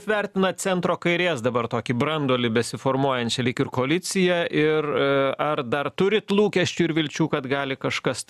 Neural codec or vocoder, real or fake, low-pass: none; real; 14.4 kHz